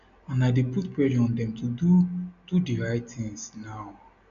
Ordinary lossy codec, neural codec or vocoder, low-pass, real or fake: none; none; 7.2 kHz; real